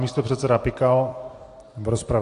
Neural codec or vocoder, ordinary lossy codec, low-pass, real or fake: none; AAC, 48 kbps; 10.8 kHz; real